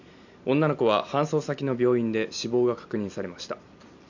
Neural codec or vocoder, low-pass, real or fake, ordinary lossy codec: none; 7.2 kHz; real; none